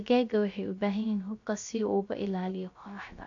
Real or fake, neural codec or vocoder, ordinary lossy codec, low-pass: fake; codec, 16 kHz, 0.3 kbps, FocalCodec; AAC, 48 kbps; 7.2 kHz